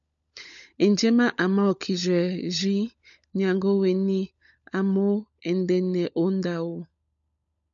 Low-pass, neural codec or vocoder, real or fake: 7.2 kHz; codec, 16 kHz, 16 kbps, FunCodec, trained on LibriTTS, 50 frames a second; fake